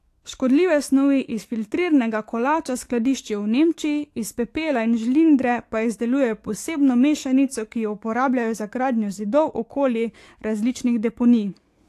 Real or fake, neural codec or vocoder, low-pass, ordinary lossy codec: fake; codec, 44.1 kHz, 7.8 kbps, DAC; 14.4 kHz; AAC, 64 kbps